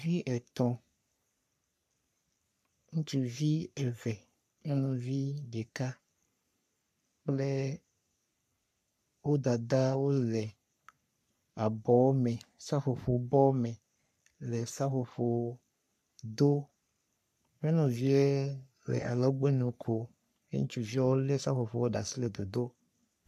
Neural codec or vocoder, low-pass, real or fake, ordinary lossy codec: codec, 44.1 kHz, 3.4 kbps, Pupu-Codec; 14.4 kHz; fake; AAC, 96 kbps